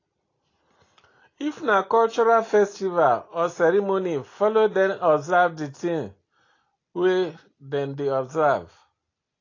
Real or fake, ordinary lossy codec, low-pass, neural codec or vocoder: real; AAC, 32 kbps; 7.2 kHz; none